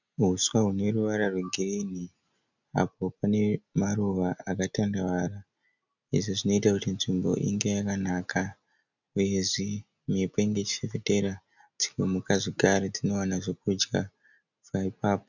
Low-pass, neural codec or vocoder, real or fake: 7.2 kHz; none; real